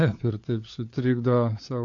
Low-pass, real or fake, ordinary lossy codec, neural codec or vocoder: 7.2 kHz; fake; AAC, 48 kbps; codec, 16 kHz, 4 kbps, FunCodec, trained on LibriTTS, 50 frames a second